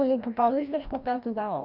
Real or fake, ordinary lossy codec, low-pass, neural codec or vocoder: fake; none; 5.4 kHz; codec, 16 kHz, 1 kbps, FreqCodec, larger model